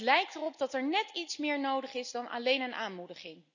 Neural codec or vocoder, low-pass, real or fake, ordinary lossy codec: none; 7.2 kHz; real; none